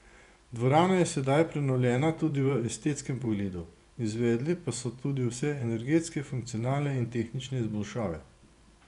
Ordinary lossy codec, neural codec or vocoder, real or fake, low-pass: none; vocoder, 24 kHz, 100 mel bands, Vocos; fake; 10.8 kHz